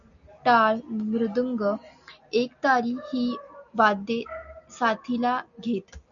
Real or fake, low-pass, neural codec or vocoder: real; 7.2 kHz; none